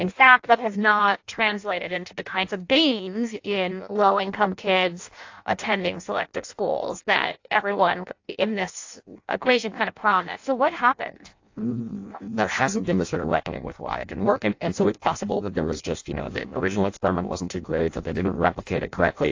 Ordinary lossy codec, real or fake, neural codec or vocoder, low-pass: AAC, 48 kbps; fake; codec, 16 kHz in and 24 kHz out, 0.6 kbps, FireRedTTS-2 codec; 7.2 kHz